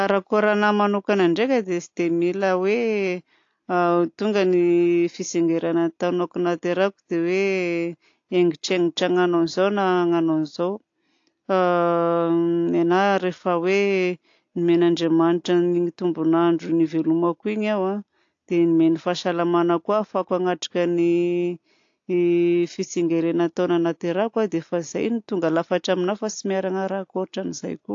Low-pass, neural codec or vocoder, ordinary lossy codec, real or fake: 7.2 kHz; none; AAC, 48 kbps; real